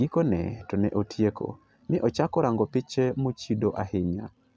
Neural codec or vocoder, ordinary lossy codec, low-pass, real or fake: none; none; none; real